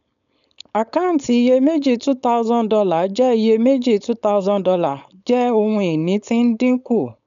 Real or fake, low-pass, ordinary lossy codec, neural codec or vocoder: fake; 7.2 kHz; none; codec, 16 kHz, 4.8 kbps, FACodec